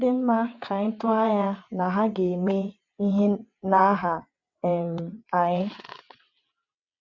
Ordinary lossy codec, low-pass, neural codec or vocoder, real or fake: none; 7.2 kHz; vocoder, 22.05 kHz, 80 mel bands, WaveNeXt; fake